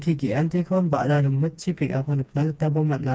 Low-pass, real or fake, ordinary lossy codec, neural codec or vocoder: none; fake; none; codec, 16 kHz, 2 kbps, FreqCodec, smaller model